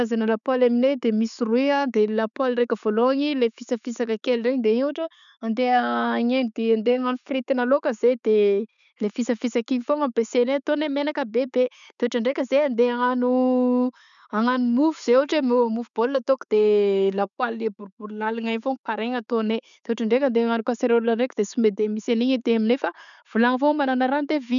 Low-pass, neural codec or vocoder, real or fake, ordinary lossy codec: 7.2 kHz; none; real; none